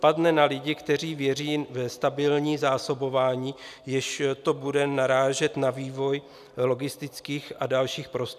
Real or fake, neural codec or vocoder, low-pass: real; none; 14.4 kHz